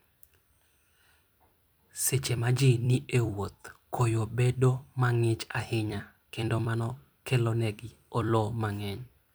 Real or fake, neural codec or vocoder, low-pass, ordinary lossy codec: fake; vocoder, 44.1 kHz, 128 mel bands every 256 samples, BigVGAN v2; none; none